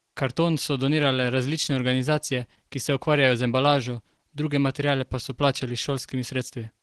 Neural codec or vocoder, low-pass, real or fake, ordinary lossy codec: none; 10.8 kHz; real; Opus, 16 kbps